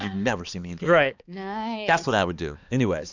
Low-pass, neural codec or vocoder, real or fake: 7.2 kHz; codec, 16 kHz, 2 kbps, X-Codec, HuBERT features, trained on balanced general audio; fake